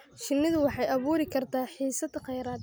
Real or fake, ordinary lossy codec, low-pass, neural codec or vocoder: real; none; none; none